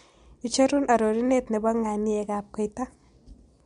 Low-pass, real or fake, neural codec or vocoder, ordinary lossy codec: 10.8 kHz; real; none; MP3, 64 kbps